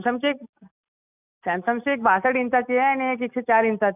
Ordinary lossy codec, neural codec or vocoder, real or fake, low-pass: none; none; real; 3.6 kHz